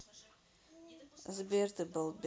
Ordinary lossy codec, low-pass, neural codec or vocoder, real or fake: none; none; none; real